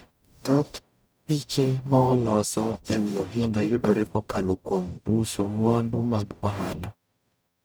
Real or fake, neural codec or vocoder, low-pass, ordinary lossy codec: fake; codec, 44.1 kHz, 0.9 kbps, DAC; none; none